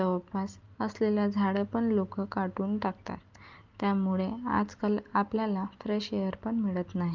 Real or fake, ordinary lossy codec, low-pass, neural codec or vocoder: real; Opus, 32 kbps; 7.2 kHz; none